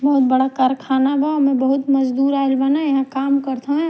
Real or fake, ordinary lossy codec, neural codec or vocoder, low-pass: real; none; none; none